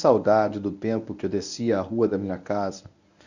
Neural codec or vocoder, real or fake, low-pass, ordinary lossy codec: codec, 24 kHz, 0.9 kbps, WavTokenizer, medium speech release version 1; fake; 7.2 kHz; none